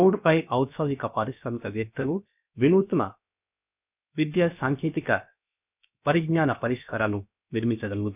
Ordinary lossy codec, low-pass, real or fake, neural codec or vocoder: none; 3.6 kHz; fake; codec, 16 kHz, about 1 kbps, DyCAST, with the encoder's durations